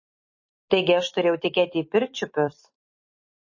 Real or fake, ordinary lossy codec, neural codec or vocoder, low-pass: fake; MP3, 32 kbps; vocoder, 44.1 kHz, 128 mel bands every 256 samples, BigVGAN v2; 7.2 kHz